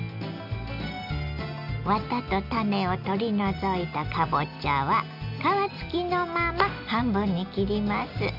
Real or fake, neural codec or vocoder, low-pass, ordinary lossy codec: real; none; 5.4 kHz; none